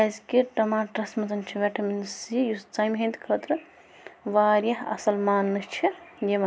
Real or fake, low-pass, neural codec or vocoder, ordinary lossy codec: real; none; none; none